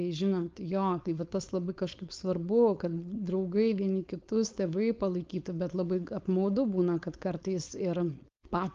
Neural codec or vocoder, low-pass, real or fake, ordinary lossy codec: codec, 16 kHz, 4.8 kbps, FACodec; 7.2 kHz; fake; Opus, 32 kbps